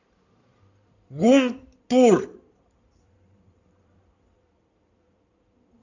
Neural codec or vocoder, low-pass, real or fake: codec, 16 kHz in and 24 kHz out, 2.2 kbps, FireRedTTS-2 codec; 7.2 kHz; fake